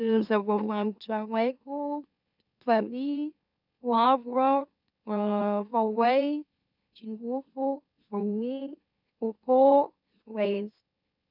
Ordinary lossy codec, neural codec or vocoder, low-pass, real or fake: none; autoencoder, 44.1 kHz, a latent of 192 numbers a frame, MeloTTS; 5.4 kHz; fake